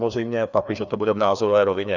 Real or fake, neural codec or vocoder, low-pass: fake; codec, 16 kHz, 2 kbps, FreqCodec, larger model; 7.2 kHz